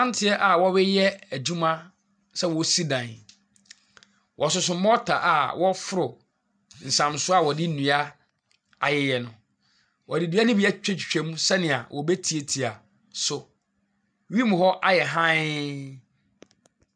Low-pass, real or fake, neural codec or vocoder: 9.9 kHz; real; none